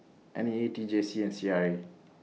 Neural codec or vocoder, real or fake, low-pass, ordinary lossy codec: none; real; none; none